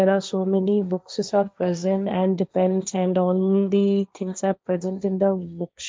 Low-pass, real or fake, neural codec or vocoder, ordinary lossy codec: none; fake; codec, 16 kHz, 1.1 kbps, Voila-Tokenizer; none